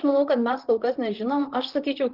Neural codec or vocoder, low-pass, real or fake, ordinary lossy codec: vocoder, 44.1 kHz, 128 mel bands, Pupu-Vocoder; 5.4 kHz; fake; Opus, 16 kbps